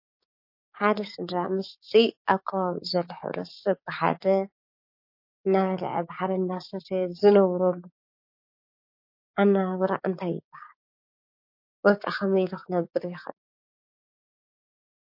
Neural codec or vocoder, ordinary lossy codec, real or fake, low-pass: codec, 16 kHz, 6 kbps, DAC; MP3, 32 kbps; fake; 5.4 kHz